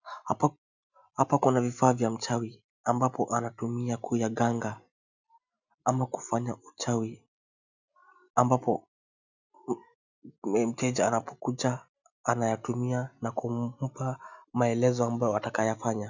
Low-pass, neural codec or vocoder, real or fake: 7.2 kHz; none; real